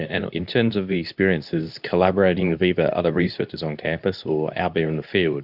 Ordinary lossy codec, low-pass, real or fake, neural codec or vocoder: Opus, 64 kbps; 5.4 kHz; fake; codec, 24 kHz, 0.9 kbps, WavTokenizer, medium speech release version 2